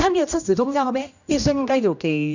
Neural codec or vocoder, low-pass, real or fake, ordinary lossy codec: codec, 16 kHz, 1 kbps, X-Codec, HuBERT features, trained on general audio; 7.2 kHz; fake; none